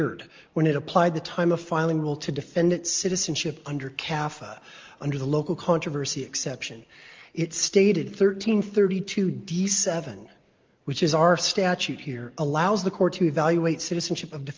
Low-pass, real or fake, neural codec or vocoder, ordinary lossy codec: 7.2 kHz; real; none; Opus, 32 kbps